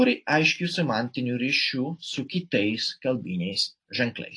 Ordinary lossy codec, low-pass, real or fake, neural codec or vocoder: AAC, 48 kbps; 9.9 kHz; real; none